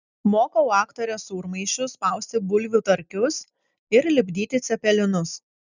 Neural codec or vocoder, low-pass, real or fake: none; 7.2 kHz; real